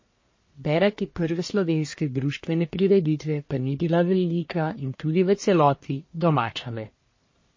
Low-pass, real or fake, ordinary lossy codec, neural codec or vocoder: 7.2 kHz; fake; MP3, 32 kbps; codec, 44.1 kHz, 1.7 kbps, Pupu-Codec